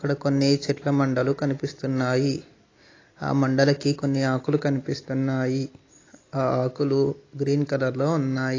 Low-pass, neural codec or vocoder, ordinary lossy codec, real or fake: 7.2 kHz; none; AAC, 32 kbps; real